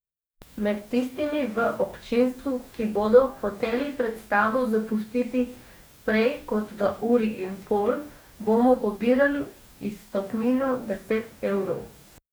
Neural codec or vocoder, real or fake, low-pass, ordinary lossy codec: codec, 44.1 kHz, 2.6 kbps, DAC; fake; none; none